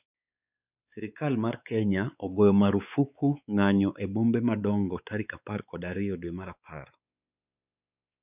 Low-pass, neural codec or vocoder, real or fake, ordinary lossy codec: 3.6 kHz; codec, 16 kHz, 4 kbps, X-Codec, WavLM features, trained on Multilingual LibriSpeech; fake; none